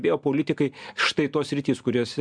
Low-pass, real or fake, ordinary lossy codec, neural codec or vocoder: 9.9 kHz; real; MP3, 96 kbps; none